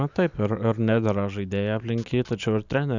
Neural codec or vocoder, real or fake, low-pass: none; real; 7.2 kHz